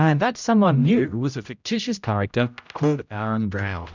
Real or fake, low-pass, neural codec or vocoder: fake; 7.2 kHz; codec, 16 kHz, 0.5 kbps, X-Codec, HuBERT features, trained on general audio